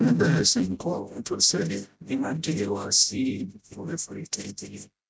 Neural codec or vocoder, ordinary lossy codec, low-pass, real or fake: codec, 16 kHz, 0.5 kbps, FreqCodec, smaller model; none; none; fake